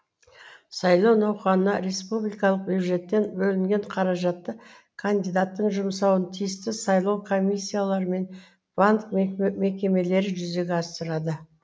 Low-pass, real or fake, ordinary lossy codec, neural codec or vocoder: none; real; none; none